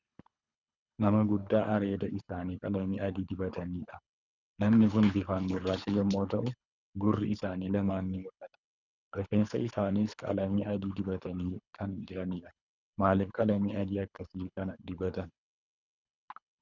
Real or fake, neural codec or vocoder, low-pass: fake; codec, 24 kHz, 3 kbps, HILCodec; 7.2 kHz